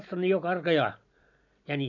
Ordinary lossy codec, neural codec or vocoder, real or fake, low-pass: none; none; real; 7.2 kHz